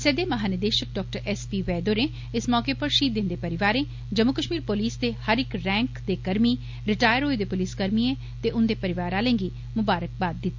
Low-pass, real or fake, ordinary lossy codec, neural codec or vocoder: 7.2 kHz; real; MP3, 48 kbps; none